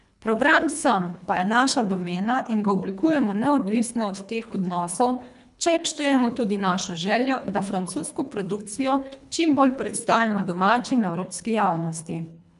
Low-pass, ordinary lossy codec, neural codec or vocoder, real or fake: 10.8 kHz; none; codec, 24 kHz, 1.5 kbps, HILCodec; fake